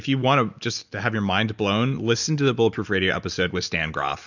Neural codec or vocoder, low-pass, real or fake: none; 7.2 kHz; real